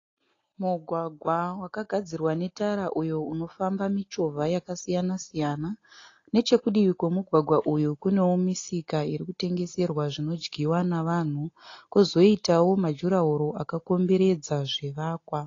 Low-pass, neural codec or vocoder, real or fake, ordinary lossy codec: 7.2 kHz; none; real; AAC, 32 kbps